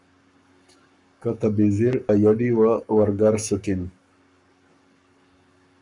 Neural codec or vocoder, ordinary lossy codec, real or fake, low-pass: codec, 44.1 kHz, 7.8 kbps, Pupu-Codec; MP3, 64 kbps; fake; 10.8 kHz